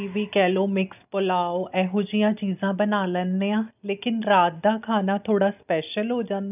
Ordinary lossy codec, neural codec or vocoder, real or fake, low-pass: none; none; real; 3.6 kHz